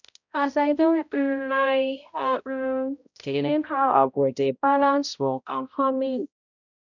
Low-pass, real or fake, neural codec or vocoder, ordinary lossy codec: 7.2 kHz; fake; codec, 16 kHz, 0.5 kbps, X-Codec, HuBERT features, trained on balanced general audio; none